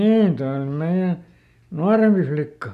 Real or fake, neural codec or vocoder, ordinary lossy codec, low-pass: real; none; none; 14.4 kHz